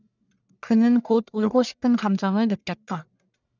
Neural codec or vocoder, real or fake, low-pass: codec, 44.1 kHz, 1.7 kbps, Pupu-Codec; fake; 7.2 kHz